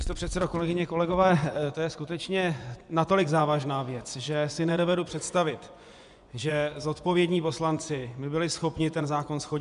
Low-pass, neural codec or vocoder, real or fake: 10.8 kHz; vocoder, 24 kHz, 100 mel bands, Vocos; fake